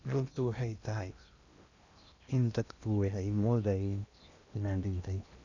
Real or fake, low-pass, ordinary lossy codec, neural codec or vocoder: fake; 7.2 kHz; none; codec, 16 kHz in and 24 kHz out, 0.8 kbps, FocalCodec, streaming, 65536 codes